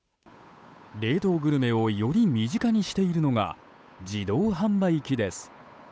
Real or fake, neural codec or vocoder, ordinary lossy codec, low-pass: fake; codec, 16 kHz, 8 kbps, FunCodec, trained on Chinese and English, 25 frames a second; none; none